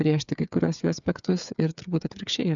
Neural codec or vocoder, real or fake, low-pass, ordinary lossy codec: codec, 16 kHz, 8 kbps, FreqCodec, smaller model; fake; 7.2 kHz; MP3, 96 kbps